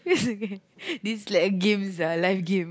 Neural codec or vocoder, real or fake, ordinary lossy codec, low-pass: none; real; none; none